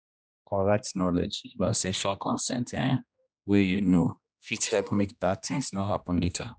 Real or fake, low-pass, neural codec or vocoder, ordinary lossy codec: fake; none; codec, 16 kHz, 1 kbps, X-Codec, HuBERT features, trained on general audio; none